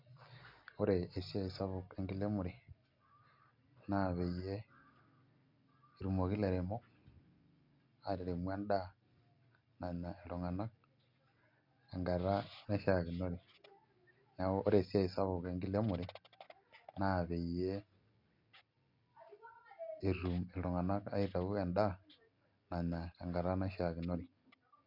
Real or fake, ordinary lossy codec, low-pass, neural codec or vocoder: real; MP3, 48 kbps; 5.4 kHz; none